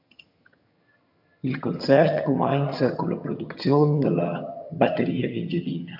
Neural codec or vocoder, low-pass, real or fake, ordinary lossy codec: vocoder, 22.05 kHz, 80 mel bands, HiFi-GAN; 5.4 kHz; fake; MP3, 48 kbps